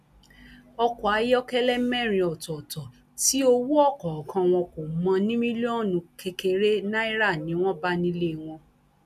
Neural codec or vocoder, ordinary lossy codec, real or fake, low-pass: none; none; real; 14.4 kHz